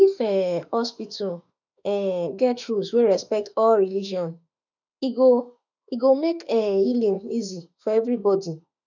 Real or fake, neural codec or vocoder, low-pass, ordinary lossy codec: fake; autoencoder, 48 kHz, 32 numbers a frame, DAC-VAE, trained on Japanese speech; 7.2 kHz; none